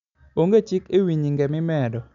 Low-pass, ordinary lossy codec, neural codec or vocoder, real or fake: 7.2 kHz; none; none; real